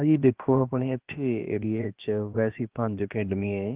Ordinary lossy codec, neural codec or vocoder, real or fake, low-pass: Opus, 16 kbps; codec, 16 kHz, about 1 kbps, DyCAST, with the encoder's durations; fake; 3.6 kHz